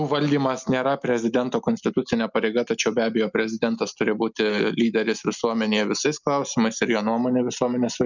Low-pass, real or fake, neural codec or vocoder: 7.2 kHz; real; none